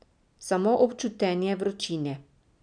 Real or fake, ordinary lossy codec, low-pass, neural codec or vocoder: real; none; 9.9 kHz; none